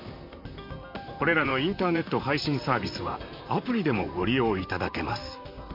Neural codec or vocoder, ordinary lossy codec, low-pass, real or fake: vocoder, 44.1 kHz, 128 mel bands, Pupu-Vocoder; MP3, 48 kbps; 5.4 kHz; fake